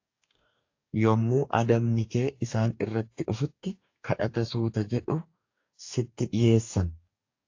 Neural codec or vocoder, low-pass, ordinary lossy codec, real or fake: codec, 44.1 kHz, 2.6 kbps, DAC; 7.2 kHz; AAC, 48 kbps; fake